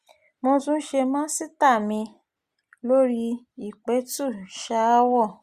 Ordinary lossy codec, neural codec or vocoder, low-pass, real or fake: Opus, 64 kbps; none; 14.4 kHz; real